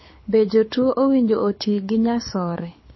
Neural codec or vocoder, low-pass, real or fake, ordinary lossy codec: codec, 24 kHz, 6 kbps, HILCodec; 7.2 kHz; fake; MP3, 24 kbps